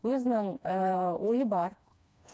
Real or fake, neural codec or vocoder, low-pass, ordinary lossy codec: fake; codec, 16 kHz, 2 kbps, FreqCodec, smaller model; none; none